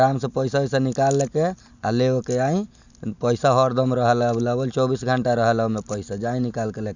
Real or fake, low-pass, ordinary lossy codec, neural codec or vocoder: real; 7.2 kHz; none; none